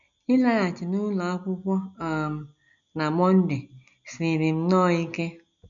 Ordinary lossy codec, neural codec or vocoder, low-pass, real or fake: none; none; 7.2 kHz; real